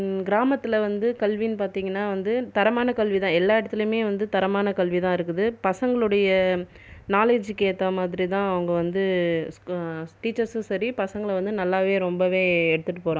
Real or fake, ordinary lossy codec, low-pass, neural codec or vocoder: real; none; none; none